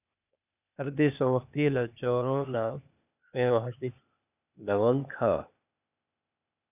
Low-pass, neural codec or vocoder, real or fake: 3.6 kHz; codec, 16 kHz, 0.8 kbps, ZipCodec; fake